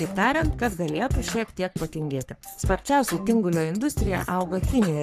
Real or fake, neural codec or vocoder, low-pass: fake; codec, 44.1 kHz, 3.4 kbps, Pupu-Codec; 14.4 kHz